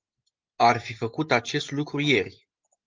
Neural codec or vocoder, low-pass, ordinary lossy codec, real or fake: none; 7.2 kHz; Opus, 24 kbps; real